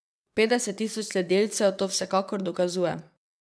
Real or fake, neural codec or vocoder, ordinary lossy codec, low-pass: fake; vocoder, 22.05 kHz, 80 mel bands, WaveNeXt; none; none